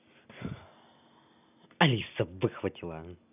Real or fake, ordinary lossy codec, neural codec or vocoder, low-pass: real; none; none; 3.6 kHz